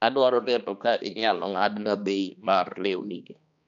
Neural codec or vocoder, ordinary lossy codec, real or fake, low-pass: codec, 16 kHz, 2 kbps, X-Codec, HuBERT features, trained on balanced general audio; none; fake; 7.2 kHz